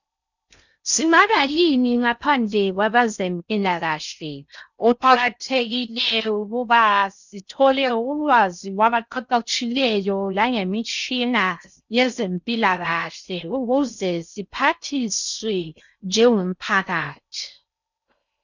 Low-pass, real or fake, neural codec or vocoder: 7.2 kHz; fake; codec, 16 kHz in and 24 kHz out, 0.6 kbps, FocalCodec, streaming, 4096 codes